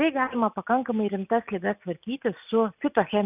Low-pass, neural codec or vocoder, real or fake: 3.6 kHz; none; real